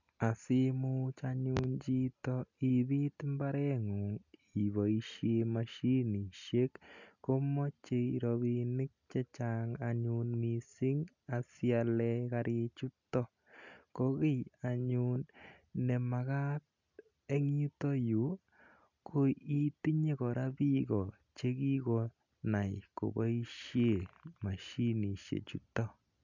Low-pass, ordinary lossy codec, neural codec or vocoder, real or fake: 7.2 kHz; none; none; real